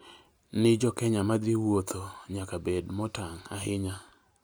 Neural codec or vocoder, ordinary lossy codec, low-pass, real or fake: none; none; none; real